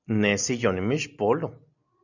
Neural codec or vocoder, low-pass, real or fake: none; 7.2 kHz; real